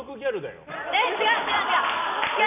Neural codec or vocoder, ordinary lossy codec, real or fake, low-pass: none; none; real; 3.6 kHz